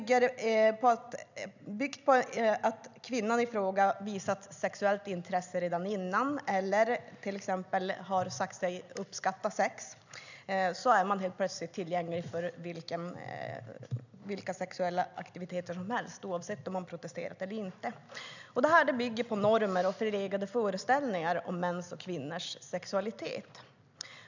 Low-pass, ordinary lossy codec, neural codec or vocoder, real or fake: 7.2 kHz; none; none; real